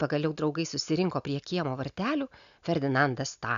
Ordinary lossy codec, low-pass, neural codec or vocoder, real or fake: MP3, 96 kbps; 7.2 kHz; none; real